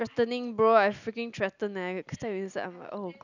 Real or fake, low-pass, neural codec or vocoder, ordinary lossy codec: real; 7.2 kHz; none; none